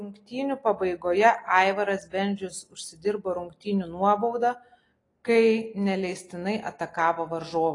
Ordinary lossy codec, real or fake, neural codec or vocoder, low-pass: AAC, 32 kbps; real; none; 10.8 kHz